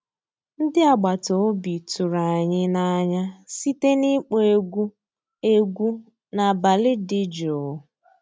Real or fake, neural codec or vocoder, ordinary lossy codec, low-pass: real; none; none; none